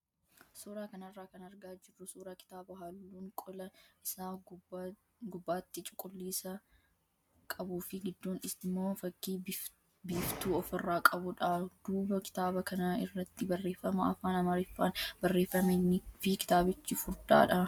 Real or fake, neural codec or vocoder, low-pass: real; none; 19.8 kHz